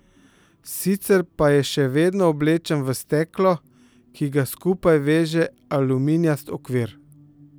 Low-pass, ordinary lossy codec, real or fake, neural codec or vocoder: none; none; real; none